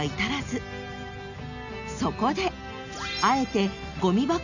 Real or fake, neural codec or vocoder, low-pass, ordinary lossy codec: real; none; 7.2 kHz; none